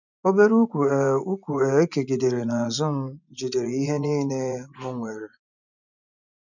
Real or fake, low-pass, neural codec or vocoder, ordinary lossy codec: fake; 7.2 kHz; vocoder, 24 kHz, 100 mel bands, Vocos; none